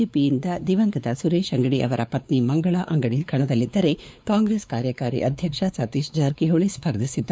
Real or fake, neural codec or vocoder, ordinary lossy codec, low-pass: fake; codec, 16 kHz, 4 kbps, X-Codec, WavLM features, trained on Multilingual LibriSpeech; none; none